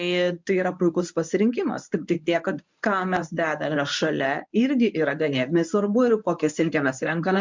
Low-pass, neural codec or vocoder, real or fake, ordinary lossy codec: 7.2 kHz; codec, 24 kHz, 0.9 kbps, WavTokenizer, medium speech release version 1; fake; MP3, 64 kbps